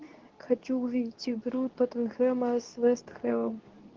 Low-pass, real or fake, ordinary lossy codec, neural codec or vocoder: 7.2 kHz; fake; Opus, 16 kbps; codec, 24 kHz, 0.9 kbps, WavTokenizer, medium speech release version 2